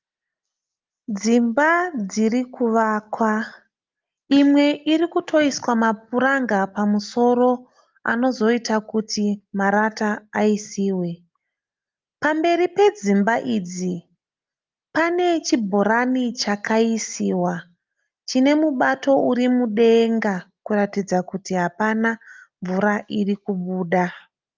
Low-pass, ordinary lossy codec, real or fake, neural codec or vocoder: 7.2 kHz; Opus, 32 kbps; real; none